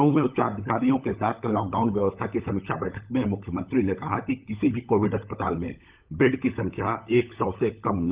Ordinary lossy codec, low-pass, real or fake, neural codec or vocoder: Opus, 32 kbps; 3.6 kHz; fake; codec, 16 kHz, 16 kbps, FunCodec, trained on LibriTTS, 50 frames a second